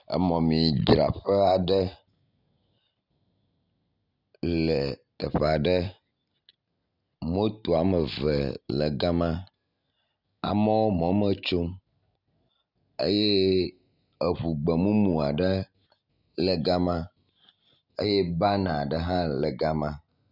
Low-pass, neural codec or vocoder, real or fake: 5.4 kHz; none; real